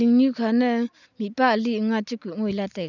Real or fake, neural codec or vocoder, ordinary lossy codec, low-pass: real; none; none; 7.2 kHz